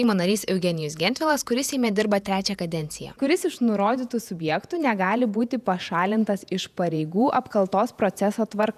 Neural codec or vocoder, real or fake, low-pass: vocoder, 48 kHz, 128 mel bands, Vocos; fake; 14.4 kHz